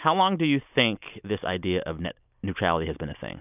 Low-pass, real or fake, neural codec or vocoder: 3.6 kHz; real; none